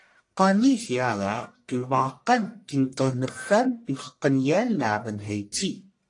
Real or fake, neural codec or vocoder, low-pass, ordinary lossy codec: fake; codec, 44.1 kHz, 1.7 kbps, Pupu-Codec; 10.8 kHz; AAC, 48 kbps